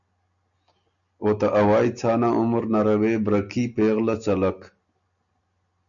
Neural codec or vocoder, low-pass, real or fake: none; 7.2 kHz; real